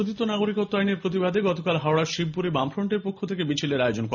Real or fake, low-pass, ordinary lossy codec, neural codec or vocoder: real; 7.2 kHz; none; none